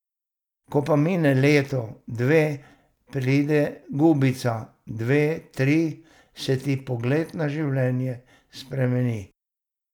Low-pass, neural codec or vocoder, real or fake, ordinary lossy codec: 19.8 kHz; none; real; none